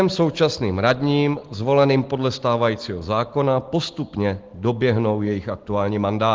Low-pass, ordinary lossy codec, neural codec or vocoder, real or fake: 7.2 kHz; Opus, 16 kbps; none; real